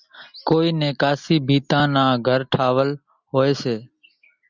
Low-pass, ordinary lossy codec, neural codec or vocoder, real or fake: 7.2 kHz; Opus, 64 kbps; none; real